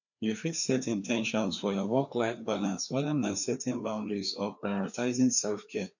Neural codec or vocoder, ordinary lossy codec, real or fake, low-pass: codec, 16 kHz, 2 kbps, FreqCodec, larger model; none; fake; 7.2 kHz